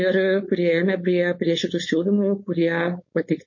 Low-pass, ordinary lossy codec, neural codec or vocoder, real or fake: 7.2 kHz; MP3, 32 kbps; codec, 16 kHz, 4.8 kbps, FACodec; fake